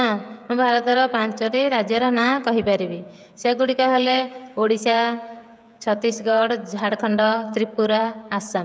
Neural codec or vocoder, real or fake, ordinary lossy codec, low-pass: codec, 16 kHz, 16 kbps, FreqCodec, smaller model; fake; none; none